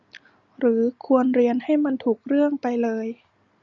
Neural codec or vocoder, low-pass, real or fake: none; 7.2 kHz; real